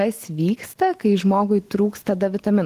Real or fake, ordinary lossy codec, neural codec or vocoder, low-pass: fake; Opus, 16 kbps; autoencoder, 48 kHz, 128 numbers a frame, DAC-VAE, trained on Japanese speech; 14.4 kHz